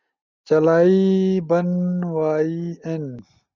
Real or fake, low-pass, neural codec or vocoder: real; 7.2 kHz; none